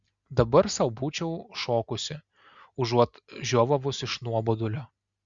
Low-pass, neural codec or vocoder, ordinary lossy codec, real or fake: 7.2 kHz; none; Opus, 64 kbps; real